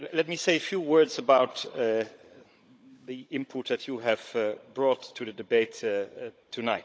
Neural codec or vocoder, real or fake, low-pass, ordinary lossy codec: codec, 16 kHz, 16 kbps, FunCodec, trained on Chinese and English, 50 frames a second; fake; none; none